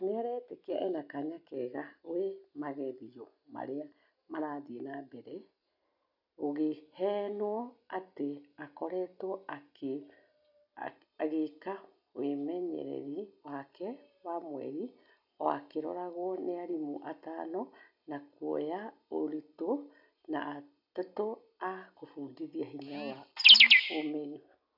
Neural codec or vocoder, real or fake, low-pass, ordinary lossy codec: none; real; 5.4 kHz; none